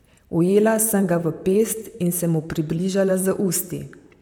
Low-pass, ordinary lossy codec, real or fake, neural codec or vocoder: 19.8 kHz; none; fake; vocoder, 44.1 kHz, 128 mel bands, Pupu-Vocoder